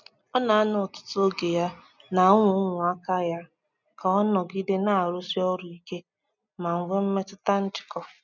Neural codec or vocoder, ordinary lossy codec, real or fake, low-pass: none; none; real; 7.2 kHz